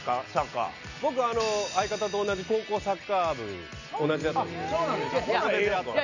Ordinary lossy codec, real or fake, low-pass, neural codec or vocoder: none; real; 7.2 kHz; none